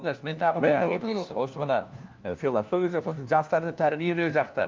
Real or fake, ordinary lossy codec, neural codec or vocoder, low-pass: fake; Opus, 24 kbps; codec, 16 kHz, 1 kbps, FunCodec, trained on LibriTTS, 50 frames a second; 7.2 kHz